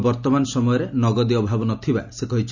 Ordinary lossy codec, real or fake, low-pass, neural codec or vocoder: none; real; 7.2 kHz; none